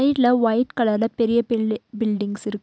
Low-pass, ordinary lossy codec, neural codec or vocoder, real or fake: none; none; none; real